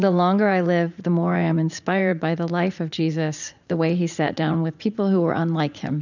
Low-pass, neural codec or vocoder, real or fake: 7.2 kHz; vocoder, 44.1 kHz, 80 mel bands, Vocos; fake